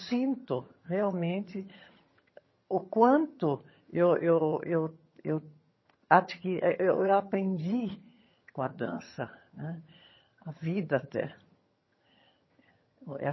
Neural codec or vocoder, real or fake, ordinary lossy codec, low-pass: vocoder, 22.05 kHz, 80 mel bands, HiFi-GAN; fake; MP3, 24 kbps; 7.2 kHz